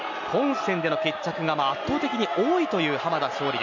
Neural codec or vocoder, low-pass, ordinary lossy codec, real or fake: none; 7.2 kHz; none; real